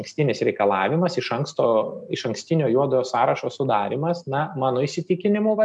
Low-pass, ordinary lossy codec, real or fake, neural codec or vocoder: 10.8 kHz; MP3, 96 kbps; real; none